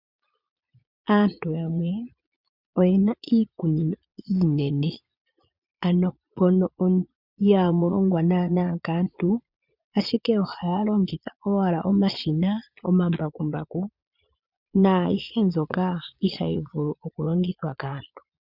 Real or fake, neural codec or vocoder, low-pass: fake; vocoder, 22.05 kHz, 80 mel bands, Vocos; 5.4 kHz